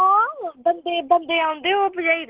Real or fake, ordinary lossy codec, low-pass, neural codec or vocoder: real; Opus, 16 kbps; 3.6 kHz; none